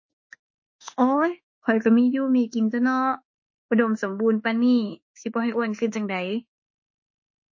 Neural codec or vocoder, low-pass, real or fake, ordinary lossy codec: autoencoder, 48 kHz, 32 numbers a frame, DAC-VAE, trained on Japanese speech; 7.2 kHz; fake; MP3, 32 kbps